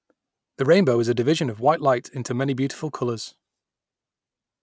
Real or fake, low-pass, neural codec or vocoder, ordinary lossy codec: real; none; none; none